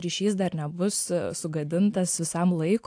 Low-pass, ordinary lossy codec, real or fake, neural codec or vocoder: 9.9 kHz; AAC, 64 kbps; real; none